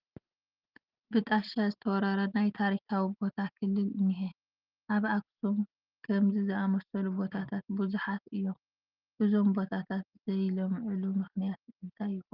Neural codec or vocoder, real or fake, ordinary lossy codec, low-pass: none; real; Opus, 32 kbps; 5.4 kHz